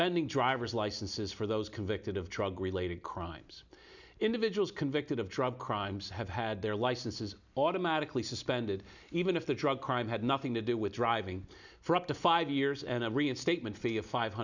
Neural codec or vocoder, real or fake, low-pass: none; real; 7.2 kHz